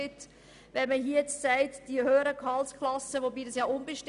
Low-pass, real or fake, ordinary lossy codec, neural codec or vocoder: 10.8 kHz; real; none; none